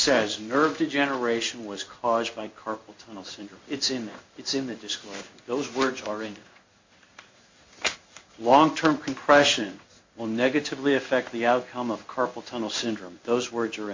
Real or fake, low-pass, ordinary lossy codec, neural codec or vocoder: fake; 7.2 kHz; MP3, 48 kbps; codec, 16 kHz in and 24 kHz out, 1 kbps, XY-Tokenizer